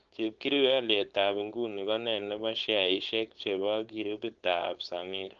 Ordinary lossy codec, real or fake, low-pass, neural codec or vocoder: Opus, 16 kbps; fake; 7.2 kHz; codec, 16 kHz, 4.8 kbps, FACodec